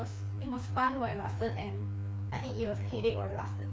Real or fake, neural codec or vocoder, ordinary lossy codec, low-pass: fake; codec, 16 kHz, 2 kbps, FreqCodec, larger model; none; none